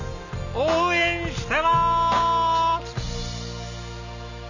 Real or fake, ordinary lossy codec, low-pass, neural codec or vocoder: real; none; 7.2 kHz; none